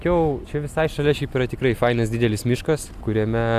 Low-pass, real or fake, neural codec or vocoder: 14.4 kHz; real; none